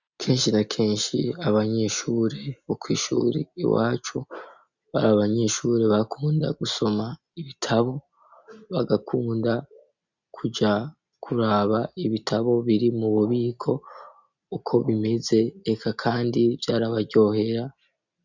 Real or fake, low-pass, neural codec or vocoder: real; 7.2 kHz; none